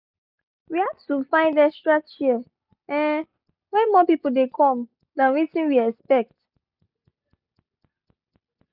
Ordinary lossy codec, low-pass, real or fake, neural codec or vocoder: none; 5.4 kHz; real; none